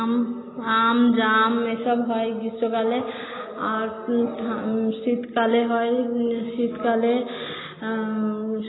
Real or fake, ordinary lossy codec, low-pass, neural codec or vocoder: real; AAC, 16 kbps; 7.2 kHz; none